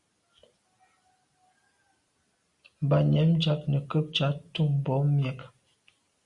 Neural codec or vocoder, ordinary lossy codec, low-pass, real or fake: none; Opus, 64 kbps; 10.8 kHz; real